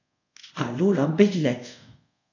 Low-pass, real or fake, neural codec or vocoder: 7.2 kHz; fake; codec, 24 kHz, 0.5 kbps, DualCodec